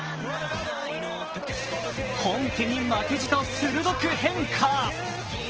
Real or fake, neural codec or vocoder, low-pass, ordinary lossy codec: real; none; 7.2 kHz; Opus, 16 kbps